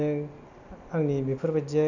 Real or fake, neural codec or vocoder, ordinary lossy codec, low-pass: real; none; none; 7.2 kHz